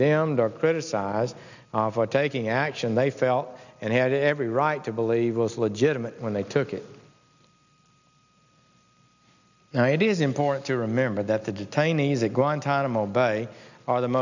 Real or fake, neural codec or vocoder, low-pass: real; none; 7.2 kHz